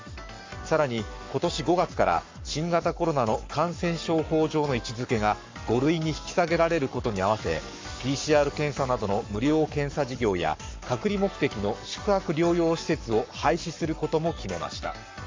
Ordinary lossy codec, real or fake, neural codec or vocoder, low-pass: MP3, 48 kbps; fake; codec, 44.1 kHz, 7.8 kbps, Pupu-Codec; 7.2 kHz